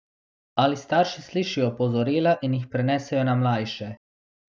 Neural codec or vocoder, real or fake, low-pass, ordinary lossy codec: none; real; none; none